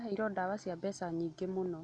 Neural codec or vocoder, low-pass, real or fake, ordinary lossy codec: none; 9.9 kHz; real; none